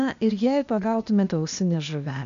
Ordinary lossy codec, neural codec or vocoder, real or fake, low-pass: MP3, 64 kbps; codec, 16 kHz, 0.8 kbps, ZipCodec; fake; 7.2 kHz